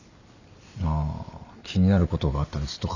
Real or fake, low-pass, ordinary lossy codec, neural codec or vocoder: real; 7.2 kHz; none; none